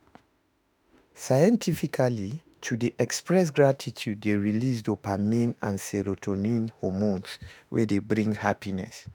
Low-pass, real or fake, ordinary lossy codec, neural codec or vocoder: none; fake; none; autoencoder, 48 kHz, 32 numbers a frame, DAC-VAE, trained on Japanese speech